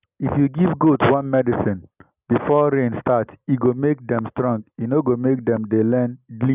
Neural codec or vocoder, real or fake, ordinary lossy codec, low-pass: none; real; none; 3.6 kHz